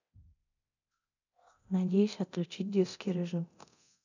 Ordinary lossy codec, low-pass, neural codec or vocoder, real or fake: none; 7.2 kHz; codec, 24 kHz, 0.9 kbps, DualCodec; fake